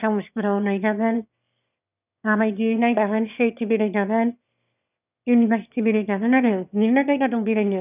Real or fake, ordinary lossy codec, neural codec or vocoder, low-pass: fake; none; autoencoder, 22.05 kHz, a latent of 192 numbers a frame, VITS, trained on one speaker; 3.6 kHz